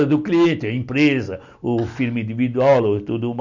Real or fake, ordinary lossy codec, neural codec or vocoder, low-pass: real; none; none; 7.2 kHz